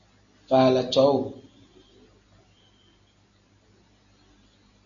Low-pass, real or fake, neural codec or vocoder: 7.2 kHz; real; none